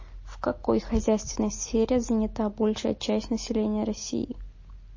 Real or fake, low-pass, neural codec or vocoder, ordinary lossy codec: real; 7.2 kHz; none; MP3, 32 kbps